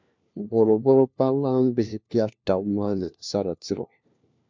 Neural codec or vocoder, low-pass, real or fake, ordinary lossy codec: codec, 16 kHz, 1 kbps, FunCodec, trained on LibriTTS, 50 frames a second; 7.2 kHz; fake; MP3, 64 kbps